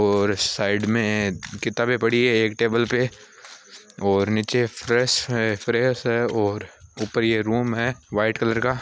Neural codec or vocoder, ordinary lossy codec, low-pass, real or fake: none; none; none; real